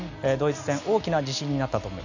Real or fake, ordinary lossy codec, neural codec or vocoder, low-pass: real; none; none; 7.2 kHz